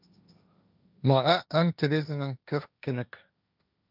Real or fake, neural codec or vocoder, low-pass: fake; codec, 16 kHz, 1.1 kbps, Voila-Tokenizer; 5.4 kHz